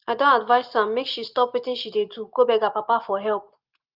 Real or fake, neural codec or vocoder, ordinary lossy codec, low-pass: real; none; Opus, 32 kbps; 5.4 kHz